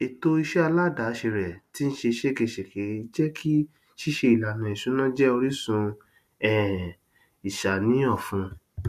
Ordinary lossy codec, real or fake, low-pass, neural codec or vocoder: none; real; 14.4 kHz; none